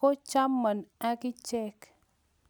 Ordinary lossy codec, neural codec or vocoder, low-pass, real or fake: none; none; none; real